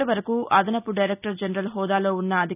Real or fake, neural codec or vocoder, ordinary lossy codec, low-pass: real; none; none; 3.6 kHz